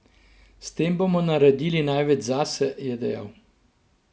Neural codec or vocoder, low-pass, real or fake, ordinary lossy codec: none; none; real; none